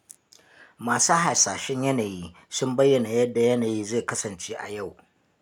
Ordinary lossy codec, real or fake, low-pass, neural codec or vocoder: none; real; 19.8 kHz; none